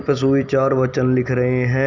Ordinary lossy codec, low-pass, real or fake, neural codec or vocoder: none; 7.2 kHz; real; none